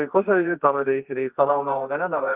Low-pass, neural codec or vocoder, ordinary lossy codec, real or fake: 3.6 kHz; codec, 24 kHz, 0.9 kbps, WavTokenizer, medium music audio release; Opus, 32 kbps; fake